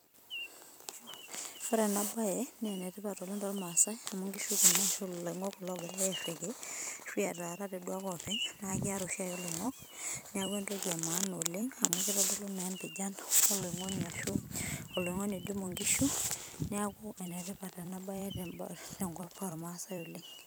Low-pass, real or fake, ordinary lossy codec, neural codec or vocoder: none; fake; none; vocoder, 44.1 kHz, 128 mel bands every 256 samples, BigVGAN v2